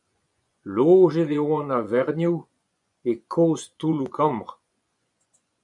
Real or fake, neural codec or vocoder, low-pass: fake; vocoder, 24 kHz, 100 mel bands, Vocos; 10.8 kHz